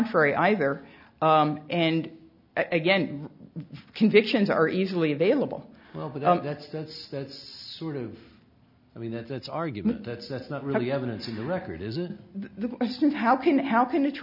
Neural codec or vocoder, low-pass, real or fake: none; 5.4 kHz; real